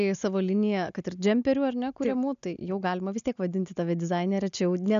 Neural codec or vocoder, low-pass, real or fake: none; 7.2 kHz; real